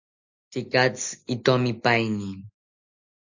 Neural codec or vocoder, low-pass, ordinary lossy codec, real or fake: none; 7.2 kHz; Opus, 64 kbps; real